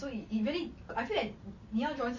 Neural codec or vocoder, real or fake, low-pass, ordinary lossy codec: none; real; 7.2 kHz; MP3, 32 kbps